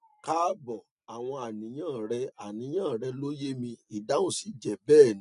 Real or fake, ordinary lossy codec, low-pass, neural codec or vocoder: real; none; 10.8 kHz; none